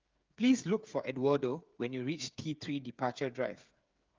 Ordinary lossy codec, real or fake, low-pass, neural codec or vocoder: Opus, 24 kbps; fake; 7.2 kHz; codec, 16 kHz, 8 kbps, FreqCodec, smaller model